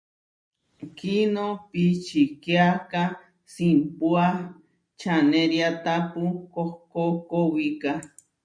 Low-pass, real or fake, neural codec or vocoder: 9.9 kHz; real; none